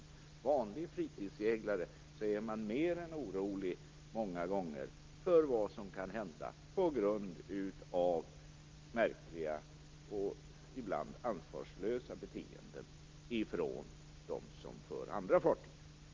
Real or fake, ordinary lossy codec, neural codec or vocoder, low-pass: real; Opus, 32 kbps; none; 7.2 kHz